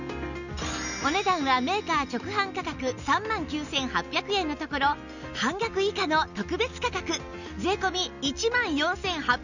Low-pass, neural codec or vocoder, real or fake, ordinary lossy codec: 7.2 kHz; none; real; none